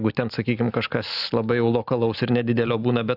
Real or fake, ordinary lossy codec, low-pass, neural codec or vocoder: real; AAC, 48 kbps; 5.4 kHz; none